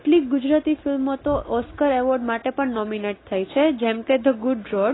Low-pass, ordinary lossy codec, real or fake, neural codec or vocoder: 7.2 kHz; AAC, 16 kbps; real; none